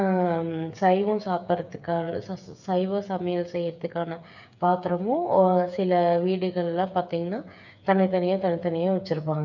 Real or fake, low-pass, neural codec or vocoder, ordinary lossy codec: fake; 7.2 kHz; codec, 16 kHz, 8 kbps, FreqCodec, smaller model; none